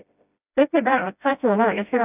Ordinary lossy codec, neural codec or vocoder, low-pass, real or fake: none; codec, 16 kHz, 0.5 kbps, FreqCodec, smaller model; 3.6 kHz; fake